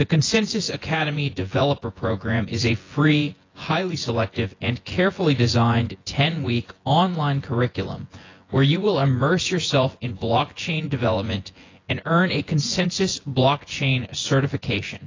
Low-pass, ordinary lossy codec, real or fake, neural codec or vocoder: 7.2 kHz; AAC, 32 kbps; fake; vocoder, 24 kHz, 100 mel bands, Vocos